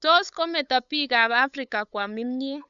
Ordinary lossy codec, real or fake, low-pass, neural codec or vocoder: none; fake; 7.2 kHz; codec, 16 kHz, 8 kbps, FunCodec, trained on LibriTTS, 25 frames a second